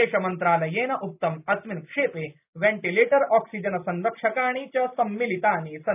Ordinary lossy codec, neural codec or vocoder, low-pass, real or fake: none; none; 3.6 kHz; real